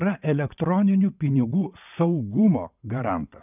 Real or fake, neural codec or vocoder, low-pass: fake; vocoder, 22.05 kHz, 80 mel bands, WaveNeXt; 3.6 kHz